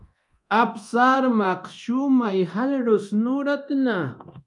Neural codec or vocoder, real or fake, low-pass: codec, 24 kHz, 0.9 kbps, DualCodec; fake; 10.8 kHz